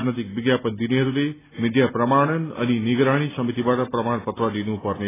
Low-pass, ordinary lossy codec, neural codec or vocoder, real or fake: 3.6 kHz; AAC, 16 kbps; none; real